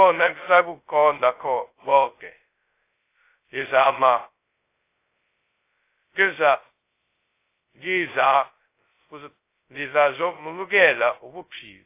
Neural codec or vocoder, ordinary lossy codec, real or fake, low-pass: codec, 16 kHz, 0.2 kbps, FocalCodec; AAC, 24 kbps; fake; 3.6 kHz